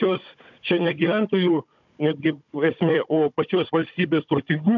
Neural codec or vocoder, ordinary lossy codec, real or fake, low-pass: codec, 16 kHz, 16 kbps, FunCodec, trained on Chinese and English, 50 frames a second; MP3, 64 kbps; fake; 7.2 kHz